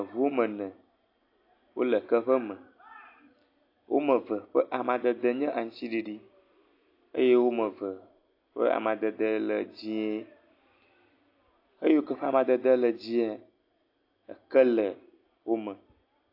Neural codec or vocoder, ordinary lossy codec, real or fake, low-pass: none; AAC, 32 kbps; real; 5.4 kHz